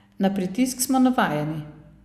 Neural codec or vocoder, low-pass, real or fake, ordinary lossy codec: none; 14.4 kHz; real; Opus, 64 kbps